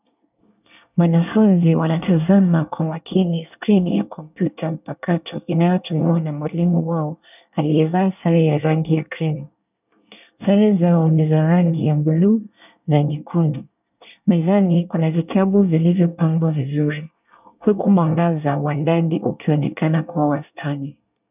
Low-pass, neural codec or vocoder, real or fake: 3.6 kHz; codec, 24 kHz, 1 kbps, SNAC; fake